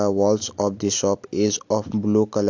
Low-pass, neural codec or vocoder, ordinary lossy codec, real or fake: 7.2 kHz; none; AAC, 48 kbps; real